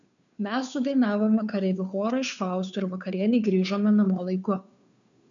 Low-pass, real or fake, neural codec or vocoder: 7.2 kHz; fake; codec, 16 kHz, 2 kbps, FunCodec, trained on Chinese and English, 25 frames a second